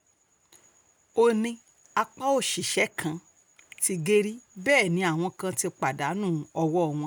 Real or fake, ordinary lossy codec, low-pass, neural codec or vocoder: real; none; none; none